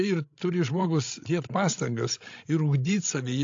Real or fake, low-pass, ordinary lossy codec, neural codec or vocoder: fake; 7.2 kHz; AAC, 48 kbps; codec, 16 kHz, 16 kbps, FreqCodec, larger model